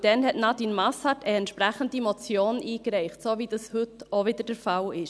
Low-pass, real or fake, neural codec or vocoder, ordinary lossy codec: none; real; none; none